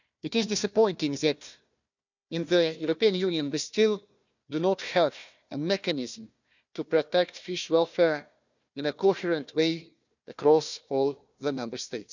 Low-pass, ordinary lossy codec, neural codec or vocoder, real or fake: 7.2 kHz; none; codec, 16 kHz, 1 kbps, FunCodec, trained on Chinese and English, 50 frames a second; fake